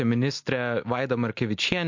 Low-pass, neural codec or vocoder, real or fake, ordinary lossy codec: 7.2 kHz; none; real; MP3, 48 kbps